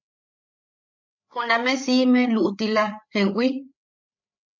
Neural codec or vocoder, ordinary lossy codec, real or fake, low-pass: codec, 16 kHz, 8 kbps, FreqCodec, larger model; MP3, 48 kbps; fake; 7.2 kHz